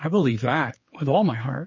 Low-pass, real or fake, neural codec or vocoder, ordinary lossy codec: 7.2 kHz; fake; codec, 16 kHz, 4 kbps, X-Codec, HuBERT features, trained on general audio; MP3, 32 kbps